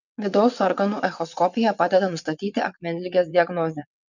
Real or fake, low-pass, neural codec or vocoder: fake; 7.2 kHz; vocoder, 22.05 kHz, 80 mel bands, WaveNeXt